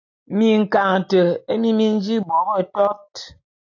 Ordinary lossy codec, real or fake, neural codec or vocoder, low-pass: AAC, 48 kbps; real; none; 7.2 kHz